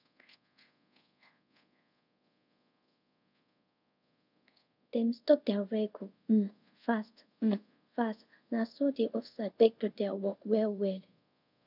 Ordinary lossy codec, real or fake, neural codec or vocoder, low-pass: none; fake; codec, 24 kHz, 0.5 kbps, DualCodec; 5.4 kHz